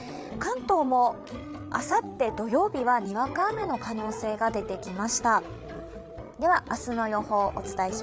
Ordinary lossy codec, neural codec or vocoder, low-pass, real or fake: none; codec, 16 kHz, 8 kbps, FreqCodec, larger model; none; fake